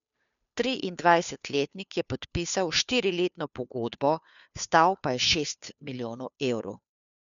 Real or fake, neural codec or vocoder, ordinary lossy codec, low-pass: fake; codec, 16 kHz, 2 kbps, FunCodec, trained on Chinese and English, 25 frames a second; none; 7.2 kHz